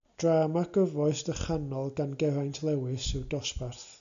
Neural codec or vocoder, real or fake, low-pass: none; real; 7.2 kHz